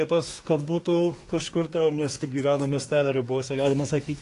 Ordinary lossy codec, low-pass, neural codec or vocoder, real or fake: AAC, 48 kbps; 10.8 kHz; codec, 24 kHz, 1 kbps, SNAC; fake